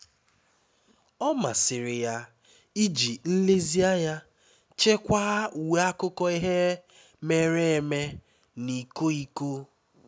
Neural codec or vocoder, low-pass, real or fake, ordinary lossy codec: none; none; real; none